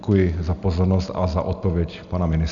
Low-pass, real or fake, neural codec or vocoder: 7.2 kHz; real; none